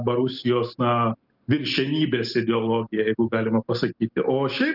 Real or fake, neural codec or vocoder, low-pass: real; none; 5.4 kHz